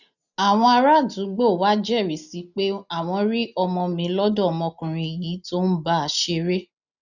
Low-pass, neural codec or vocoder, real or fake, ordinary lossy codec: 7.2 kHz; none; real; none